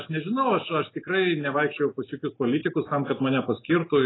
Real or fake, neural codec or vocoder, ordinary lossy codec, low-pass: real; none; AAC, 16 kbps; 7.2 kHz